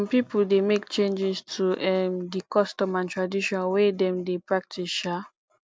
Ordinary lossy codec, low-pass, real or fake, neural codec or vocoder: none; none; real; none